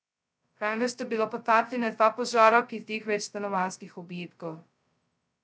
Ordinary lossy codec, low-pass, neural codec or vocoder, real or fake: none; none; codec, 16 kHz, 0.2 kbps, FocalCodec; fake